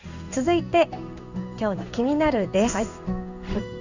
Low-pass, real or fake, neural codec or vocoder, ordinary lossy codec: 7.2 kHz; fake; codec, 16 kHz in and 24 kHz out, 1 kbps, XY-Tokenizer; none